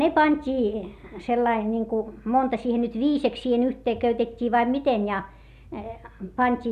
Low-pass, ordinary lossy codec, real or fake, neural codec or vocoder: 14.4 kHz; none; real; none